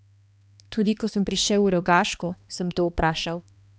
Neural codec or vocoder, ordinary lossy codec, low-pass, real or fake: codec, 16 kHz, 2 kbps, X-Codec, HuBERT features, trained on balanced general audio; none; none; fake